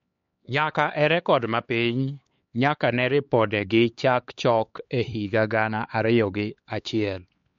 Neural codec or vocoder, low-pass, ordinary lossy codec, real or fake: codec, 16 kHz, 4 kbps, X-Codec, HuBERT features, trained on LibriSpeech; 7.2 kHz; MP3, 48 kbps; fake